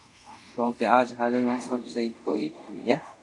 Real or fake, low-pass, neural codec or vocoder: fake; 10.8 kHz; codec, 24 kHz, 0.5 kbps, DualCodec